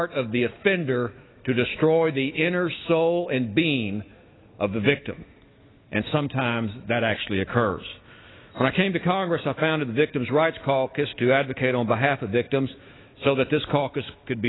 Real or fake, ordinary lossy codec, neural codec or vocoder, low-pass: fake; AAC, 16 kbps; autoencoder, 48 kHz, 128 numbers a frame, DAC-VAE, trained on Japanese speech; 7.2 kHz